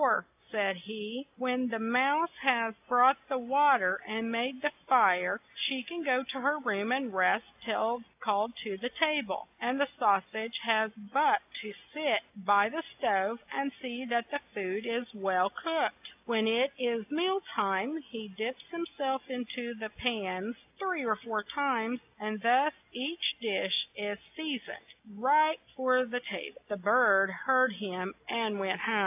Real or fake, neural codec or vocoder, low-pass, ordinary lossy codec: real; none; 3.6 kHz; AAC, 32 kbps